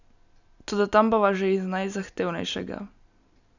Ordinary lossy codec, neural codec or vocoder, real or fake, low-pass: none; none; real; 7.2 kHz